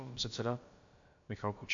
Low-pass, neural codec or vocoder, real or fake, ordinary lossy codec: 7.2 kHz; codec, 16 kHz, about 1 kbps, DyCAST, with the encoder's durations; fake; MP3, 48 kbps